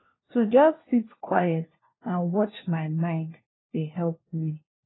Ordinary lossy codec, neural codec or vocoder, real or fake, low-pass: AAC, 16 kbps; codec, 16 kHz, 1 kbps, FunCodec, trained on LibriTTS, 50 frames a second; fake; 7.2 kHz